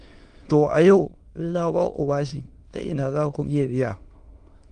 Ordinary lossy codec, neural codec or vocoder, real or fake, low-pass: Opus, 24 kbps; autoencoder, 22.05 kHz, a latent of 192 numbers a frame, VITS, trained on many speakers; fake; 9.9 kHz